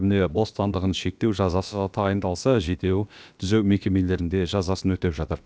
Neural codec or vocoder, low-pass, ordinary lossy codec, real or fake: codec, 16 kHz, about 1 kbps, DyCAST, with the encoder's durations; none; none; fake